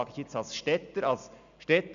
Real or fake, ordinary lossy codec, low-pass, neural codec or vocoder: real; AAC, 96 kbps; 7.2 kHz; none